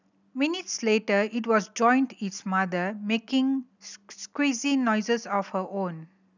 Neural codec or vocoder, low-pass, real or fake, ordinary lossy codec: none; 7.2 kHz; real; none